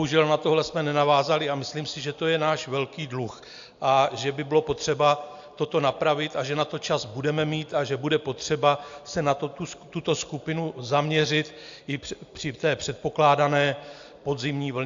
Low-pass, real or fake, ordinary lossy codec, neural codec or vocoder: 7.2 kHz; real; AAC, 64 kbps; none